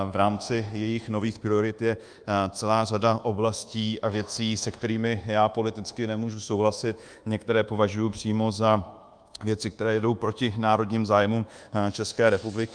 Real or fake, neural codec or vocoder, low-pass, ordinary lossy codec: fake; codec, 24 kHz, 1.2 kbps, DualCodec; 9.9 kHz; Opus, 32 kbps